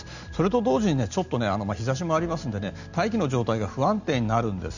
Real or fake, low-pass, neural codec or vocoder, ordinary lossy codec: real; 7.2 kHz; none; none